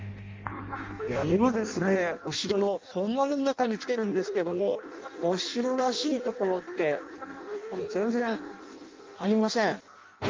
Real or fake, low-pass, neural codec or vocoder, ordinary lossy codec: fake; 7.2 kHz; codec, 16 kHz in and 24 kHz out, 0.6 kbps, FireRedTTS-2 codec; Opus, 32 kbps